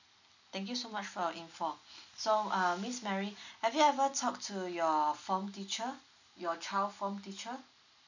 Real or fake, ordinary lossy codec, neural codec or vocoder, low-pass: real; none; none; 7.2 kHz